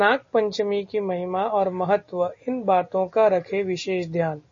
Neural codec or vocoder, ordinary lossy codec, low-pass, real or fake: none; MP3, 32 kbps; 7.2 kHz; real